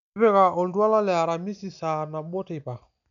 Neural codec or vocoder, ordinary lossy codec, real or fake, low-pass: none; none; real; 7.2 kHz